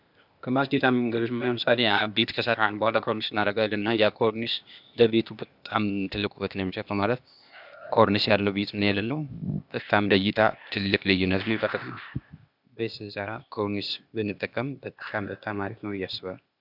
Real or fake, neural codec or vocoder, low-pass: fake; codec, 16 kHz, 0.8 kbps, ZipCodec; 5.4 kHz